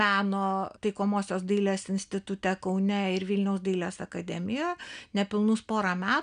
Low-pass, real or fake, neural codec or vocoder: 9.9 kHz; real; none